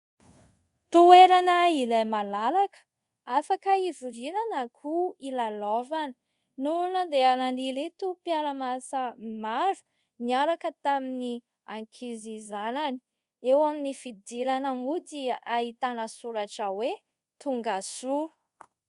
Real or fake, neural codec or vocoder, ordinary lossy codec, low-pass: fake; codec, 24 kHz, 0.5 kbps, DualCodec; Opus, 64 kbps; 10.8 kHz